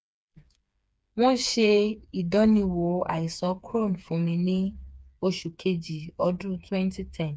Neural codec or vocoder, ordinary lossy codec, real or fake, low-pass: codec, 16 kHz, 4 kbps, FreqCodec, smaller model; none; fake; none